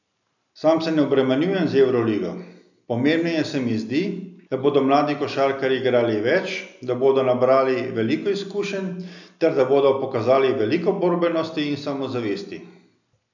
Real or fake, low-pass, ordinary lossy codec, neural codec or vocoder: real; 7.2 kHz; none; none